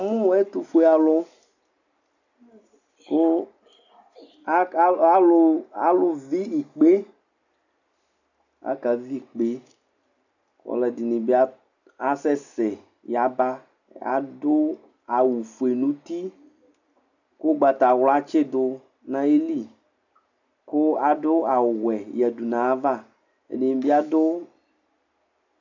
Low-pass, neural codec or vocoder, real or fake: 7.2 kHz; none; real